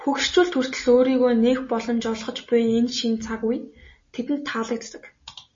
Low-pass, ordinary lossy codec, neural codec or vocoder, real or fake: 7.2 kHz; MP3, 32 kbps; none; real